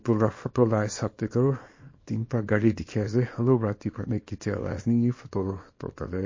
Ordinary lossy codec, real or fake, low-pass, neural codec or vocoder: MP3, 32 kbps; fake; 7.2 kHz; codec, 24 kHz, 0.9 kbps, WavTokenizer, small release